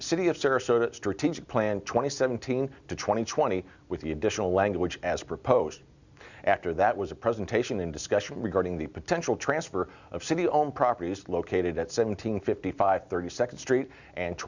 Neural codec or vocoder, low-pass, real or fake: none; 7.2 kHz; real